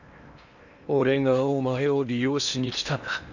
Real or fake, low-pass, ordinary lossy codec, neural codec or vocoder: fake; 7.2 kHz; none; codec, 16 kHz in and 24 kHz out, 0.8 kbps, FocalCodec, streaming, 65536 codes